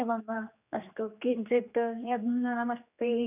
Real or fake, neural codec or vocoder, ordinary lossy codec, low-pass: fake; codec, 16 kHz, 2 kbps, X-Codec, HuBERT features, trained on general audio; none; 3.6 kHz